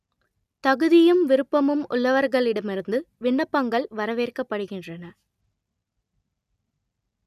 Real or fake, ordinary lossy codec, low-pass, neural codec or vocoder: real; none; 14.4 kHz; none